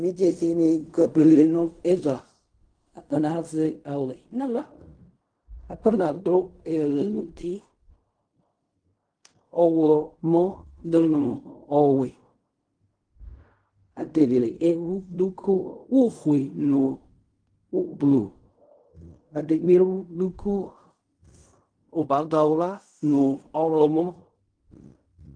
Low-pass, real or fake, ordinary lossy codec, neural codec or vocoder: 9.9 kHz; fake; Opus, 32 kbps; codec, 16 kHz in and 24 kHz out, 0.4 kbps, LongCat-Audio-Codec, fine tuned four codebook decoder